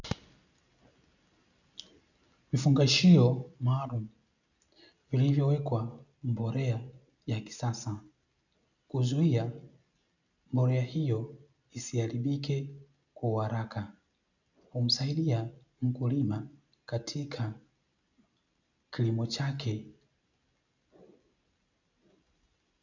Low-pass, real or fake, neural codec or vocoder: 7.2 kHz; real; none